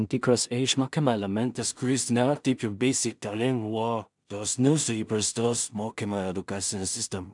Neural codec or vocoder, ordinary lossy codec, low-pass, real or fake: codec, 16 kHz in and 24 kHz out, 0.4 kbps, LongCat-Audio-Codec, two codebook decoder; MP3, 96 kbps; 10.8 kHz; fake